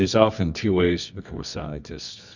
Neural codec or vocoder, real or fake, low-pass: codec, 24 kHz, 0.9 kbps, WavTokenizer, medium music audio release; fake; 7.2 kHz